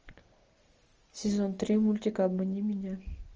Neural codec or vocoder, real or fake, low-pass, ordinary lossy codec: none; real; 7.2 kHz; Opus, 24 kbps